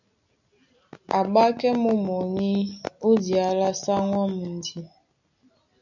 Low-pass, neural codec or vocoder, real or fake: 7.2 kHz; none; real